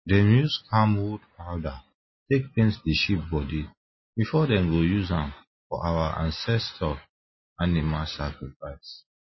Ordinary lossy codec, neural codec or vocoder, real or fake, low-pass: MP3, 24 kbps; none; real; 7.2 kHz